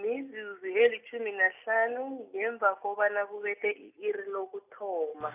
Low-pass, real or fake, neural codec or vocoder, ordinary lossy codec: 3.6 kHz; real; none; none